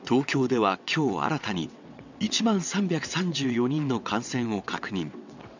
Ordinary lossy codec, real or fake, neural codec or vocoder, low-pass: none; fake; vocoder, 22.05 kHz, 80 mel bands, WaveNeXt; 7.2 kHz